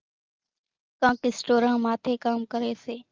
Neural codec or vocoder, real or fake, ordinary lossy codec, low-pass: none; real; Opus, 24 kbps; 7.2 kHz